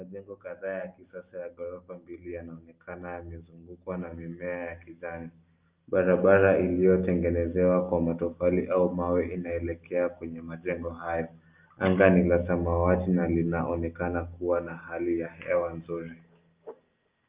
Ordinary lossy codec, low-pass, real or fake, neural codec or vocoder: Opus, 24 kbps; 3.6 kHz; real; none